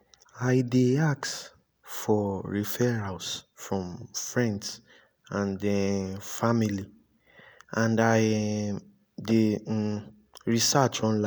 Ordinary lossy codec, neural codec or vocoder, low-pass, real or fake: none; none; none; real